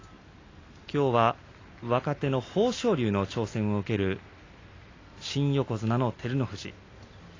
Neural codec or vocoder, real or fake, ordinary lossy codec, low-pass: none; real; AAC, 32 kbps; 7.2 kHz